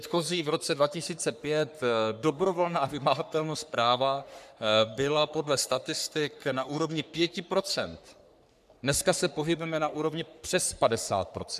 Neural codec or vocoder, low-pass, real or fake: codec, 44.1 kHz, 3.4 kbps, Pupu-Codec; 14.4 kHz; fake